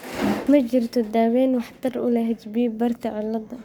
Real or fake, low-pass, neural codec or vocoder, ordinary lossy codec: fake; none; codec, 44.1 kHz, 7.8 kbps, Pupu-Codec; none